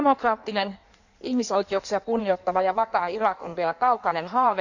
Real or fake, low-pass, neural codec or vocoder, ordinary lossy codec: fake; 7.2 kHz; codec, 16 kHz in and 24 kHz out, 1.1 kbps, FireRedTTS-2 codec; none